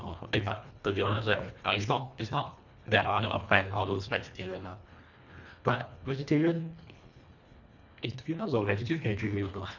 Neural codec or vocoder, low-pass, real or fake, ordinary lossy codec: codec, 24 kHz, 1.5 kbps, HILCodec; 7.2 kHz; fake; none